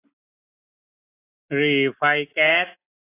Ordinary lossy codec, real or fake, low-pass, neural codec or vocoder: AAC, 16 kbps; real; 3.6 kHz; none